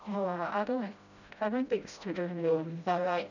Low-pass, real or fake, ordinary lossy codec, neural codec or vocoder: 7.2 kHz; fake; none; codec, 16 kHz, 0.5 kbps, FreqCodec, smaller model